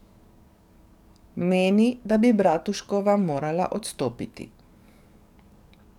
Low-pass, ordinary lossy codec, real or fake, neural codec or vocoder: 19.8 kHz; none; fake; codec, 44.1 kHz, 7.8 kbps, DAC